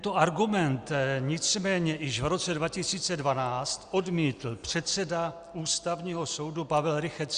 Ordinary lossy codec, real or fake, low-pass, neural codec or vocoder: Opus, 64 kbps; real; 9.9 kHz; none